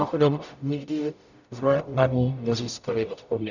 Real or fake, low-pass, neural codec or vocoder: fake; 7.2 kHz; codec, 44.1 kHz, 0.9 kbps, DAC